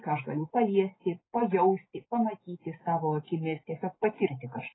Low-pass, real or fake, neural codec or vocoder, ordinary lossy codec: 7.2 kHz; real; none; AAC, 16 kbps